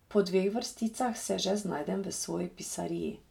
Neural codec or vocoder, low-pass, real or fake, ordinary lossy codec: none; 19.8 kHz; real; none